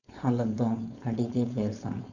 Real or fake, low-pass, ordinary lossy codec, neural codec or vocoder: fake; 7.2 kHz; none; codec, 16 kHz, 4.8 kbps, FACodec